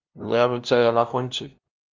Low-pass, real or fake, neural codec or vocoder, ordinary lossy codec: 7.2 kHz; fake; codec, 16 kHz, 0.5 kbps, FunCodec, trained on LibriTTS, 25 frames a second; Opus, 24 kbps